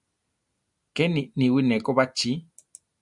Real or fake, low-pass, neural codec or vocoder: real; 10.8 kHz; none